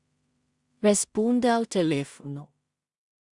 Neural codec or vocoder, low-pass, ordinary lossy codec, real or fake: codec, 16 kHz in and 24 kHz out, 0.4 kbps, LongCat-Audio-Codec, two codebook decoder; 10.8 kHz; Opus, 64 kbps; fake